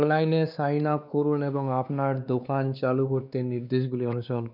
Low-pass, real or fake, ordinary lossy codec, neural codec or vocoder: 5.4 kHz; fake; none; codec, 16 kHz, 2 kbps, X-Codec, WavLM features, trained on Multilingual LibriSpeech